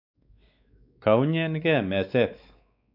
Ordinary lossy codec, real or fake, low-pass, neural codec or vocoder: none; fake; 5.4 kHz; codec, 16 kHz, 4 kbps, X-Codec, WavLM features, trained on Multilingual LibriSpeech